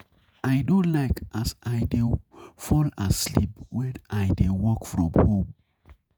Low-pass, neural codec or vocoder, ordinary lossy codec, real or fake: none; vocoder, 48 kHz, 128 mel bands, Vocos; none; fake